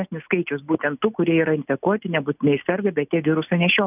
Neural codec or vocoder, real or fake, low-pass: none; real; 3.6 kHz